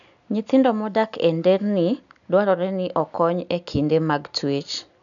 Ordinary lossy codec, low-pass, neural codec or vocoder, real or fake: none; 7.2 kHz; none; real